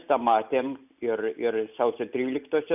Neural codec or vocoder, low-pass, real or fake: none; 3.6 kHz; real